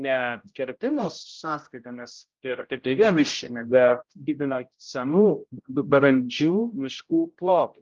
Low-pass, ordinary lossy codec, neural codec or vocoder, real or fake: 7.2 kHz; Opus, 32 kbps; codec, 16 kHz, 0.5 kbps, X-Codec, HuBERT features, trained on general audio; fake